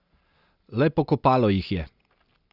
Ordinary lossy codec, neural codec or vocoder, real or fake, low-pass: Opus, 64 kbps; none; real; 5.4 kHz